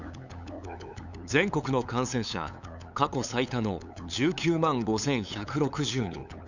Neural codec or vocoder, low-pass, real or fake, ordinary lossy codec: codec, 16 kHz, 8 kbps, FunCodec, trained on LibriTTS, 25 frames a second; 7.2 kHz; fake; none